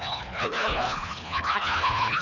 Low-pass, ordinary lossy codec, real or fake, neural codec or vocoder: 7.2 kHz; none; fake; codec, 24 kHz, 1.5 kbps, HILCodec